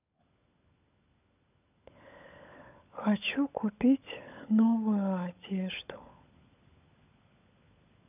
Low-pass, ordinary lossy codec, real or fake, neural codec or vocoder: 3.6 kHz; none; fake; codec, 16 kHz, 16 kbps, FunCodec, trained on LibriTTS, 50 frames a second